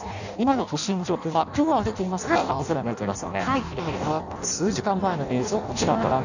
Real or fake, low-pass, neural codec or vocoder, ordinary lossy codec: fake; 7.2 kHz; codec, 16 kHz in and 24 kHz out, 0.6 kbps, FireRedTTS-2 codec; none